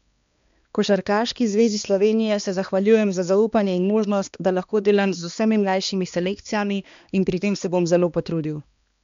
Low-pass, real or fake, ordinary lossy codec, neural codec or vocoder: 7.2 kHz; fake; MP3, 64 kbps; codec, 16 kHz, 2 kbps, X-Codec, HuBERT features, trained on balanced general audio